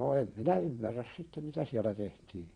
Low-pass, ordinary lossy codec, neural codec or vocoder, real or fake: 9.9 kHz; Opus, 64 kbps; vocoder, 22.05 kHz, 80 mel bands, Vocos; fake